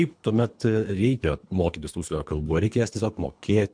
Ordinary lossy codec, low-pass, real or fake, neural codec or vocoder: MP3, 64 kbps; 9.9 kHz; fake; codec, 24 kHz, 3 kbps, HILCodec